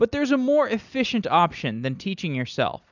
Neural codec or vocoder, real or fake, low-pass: none; real; 7.2 kHz